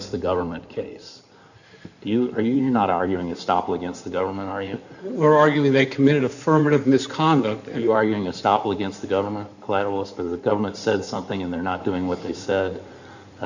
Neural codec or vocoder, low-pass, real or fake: codec, 16 kHz in and 24 kHz out, 2.2 kbps, FireRedTTS-2 codec; 7.2 kHz; fake